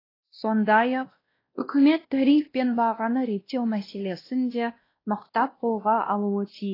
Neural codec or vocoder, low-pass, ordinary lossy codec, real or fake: codec, 16 kHz, 1 kbps, X-Codec, WavLM features, trained on Multilingual LibriSpeech; 5.4 kHz; AAC, 24 kbps; fake